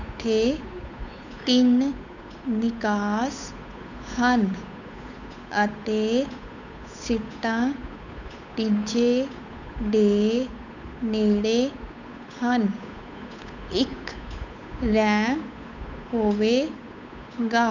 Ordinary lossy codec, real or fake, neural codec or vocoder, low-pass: none; fake; codec, 16 kHz, 8 kbps, FunCodec, trained on Chinese and English, 25 frames a second; 7.2 kHz